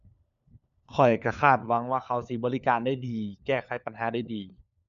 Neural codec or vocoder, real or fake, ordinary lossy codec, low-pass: codec, 16 kHz, 4 kbps, FunCodec, trained on LibriTTS, 50 frames a second; fake; none; 7.2 kHz